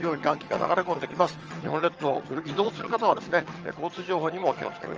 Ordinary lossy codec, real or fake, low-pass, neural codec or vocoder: Opus, 24 kbps; fake; 7.2 kHz; vocoder, 22.05 kHz, 80 mel bands, HiFi-GAN